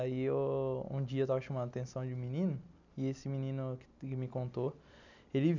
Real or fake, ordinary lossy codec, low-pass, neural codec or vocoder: real; none; 7.2 kHz; none